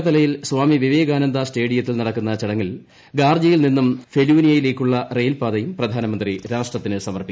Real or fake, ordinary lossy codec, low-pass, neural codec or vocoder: real; none; none; none